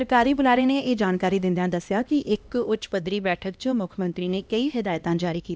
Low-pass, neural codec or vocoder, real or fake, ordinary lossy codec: none; codec, 16 kHz, 1 kbps, X-Codec, HuBERT features, trained on LibriSpeech; fake; none